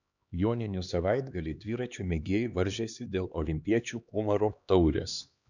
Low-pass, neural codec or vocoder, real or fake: 7.2 kHz; codec, 16 kHz, 2 kbps, X-Codec, HuBERT features, trained on LibriSpeech; fake